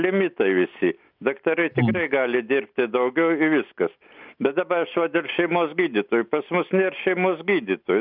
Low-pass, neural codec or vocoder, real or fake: 5.4 kHz; none; real